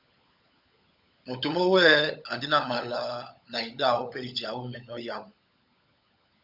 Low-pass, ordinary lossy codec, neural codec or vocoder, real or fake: 5.4 kHz; Opus, 64 kbps; codec, 16 kHz, 16 kbps, FunCodec, trained on LibriTTS, 50 frames a second; fake